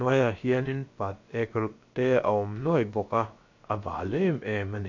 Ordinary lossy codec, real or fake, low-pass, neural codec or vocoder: MP3, 48 kbps; fake; 7.2 kHz; codec, 16 kHz, about 1 kbps, DyCAST, with the encoder's durations